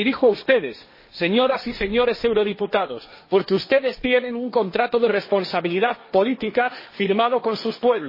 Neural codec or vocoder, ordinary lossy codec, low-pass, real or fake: codec, 16 kHz, 1.1 kbps, Voila-Tokenizer; MP3, 24 kbps; 5.4 kHz; fake